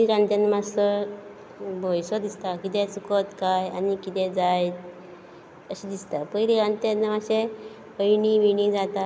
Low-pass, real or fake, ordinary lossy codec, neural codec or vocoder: none; real; none; none